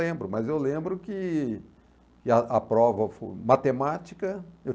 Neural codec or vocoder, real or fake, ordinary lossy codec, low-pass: none; real; none; none